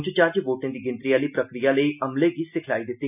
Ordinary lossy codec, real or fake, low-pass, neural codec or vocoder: none; real; 3.6 kHz; none